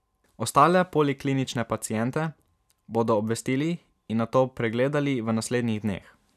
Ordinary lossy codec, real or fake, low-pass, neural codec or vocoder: none; fake; 14.4 kHz; vocoder, 44.1 kHz, 128 mel bands every 256 samples, BigVGAN v2